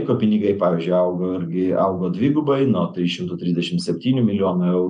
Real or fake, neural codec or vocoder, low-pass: real; none; 9.9 kHz